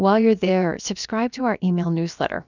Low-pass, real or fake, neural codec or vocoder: 7.2 kHz; fake; codec, 16 kHz, about 1 kbps, DyCAST, with the encoder's durations